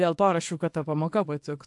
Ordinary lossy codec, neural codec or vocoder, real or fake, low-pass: AAC, 64 kbps; codec, 24 kHz, 0.9 kbps, WavTokenizer, small release; fake; 10.8 kHz